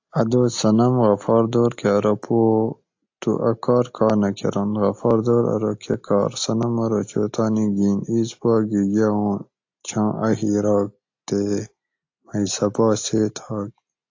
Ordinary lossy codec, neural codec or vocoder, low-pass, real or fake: AAC, 48 kbps; none; 7.2 kHz; real